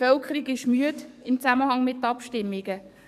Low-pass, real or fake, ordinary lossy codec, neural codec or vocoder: 14.4 kHz; fake; none; codec, 44.1 kHz, 7.8 kbps, DAC